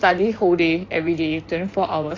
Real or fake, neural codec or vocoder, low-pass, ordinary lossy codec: fake; codec, 16 kHz in and 24 kHz out, 2.2 kbps, FireRedTTS-2 codec; 7.2 kHz; AAC, 32 kbps